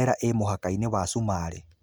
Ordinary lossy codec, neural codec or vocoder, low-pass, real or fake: none; none; none; real